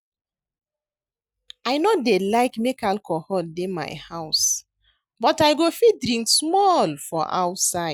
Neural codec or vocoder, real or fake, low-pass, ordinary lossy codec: none; real; none; none